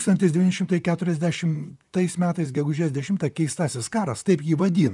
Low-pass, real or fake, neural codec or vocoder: 10.8 kHz; fake; vocoder, 44.1 kHz, 128 mel bands, Pupu-Vocoder